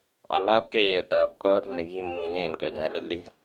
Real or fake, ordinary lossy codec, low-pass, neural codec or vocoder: fake; MP3, 96 kbps; 19.8 kHz; codec, 44.1 kHz, 2.6 kbps, DAC